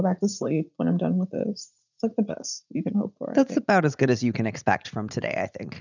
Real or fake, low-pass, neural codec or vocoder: fake; 7.2 kHz; vocoder, 44.1 kHz, 80 mel bands, Vocos